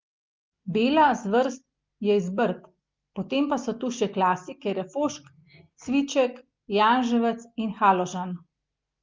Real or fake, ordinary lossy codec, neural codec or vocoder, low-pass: real; Opus, 24 kbps; none; 7.2 kHz